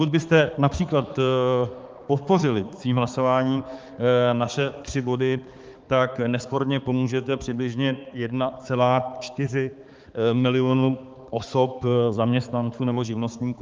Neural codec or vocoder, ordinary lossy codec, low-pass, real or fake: codec, 16 kHz, 4 kbps, X-Codec, HuBERT features, trained on balanced general audio; Opus, 24 kbps; 7.2 kHz; fake